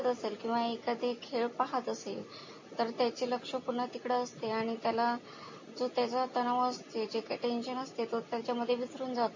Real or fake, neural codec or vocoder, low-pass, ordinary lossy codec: real; none; 7.2 kHz; MP3, 32 kbps